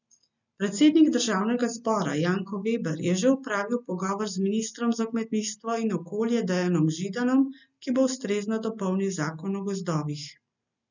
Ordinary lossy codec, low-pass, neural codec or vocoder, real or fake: none; 7.2 kHz; none; real